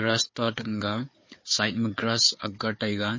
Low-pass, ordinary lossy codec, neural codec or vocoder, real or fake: 7.2 kHz; MP3, 32 kbps; codec, 16 kHz, 4 kbps, FunCodec, trained on Chinese and English, 50 frames a second; fake